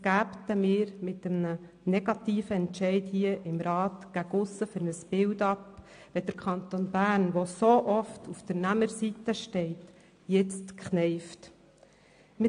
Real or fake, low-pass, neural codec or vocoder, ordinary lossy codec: real; 9.9 kHz; none; AAC, 64 kbps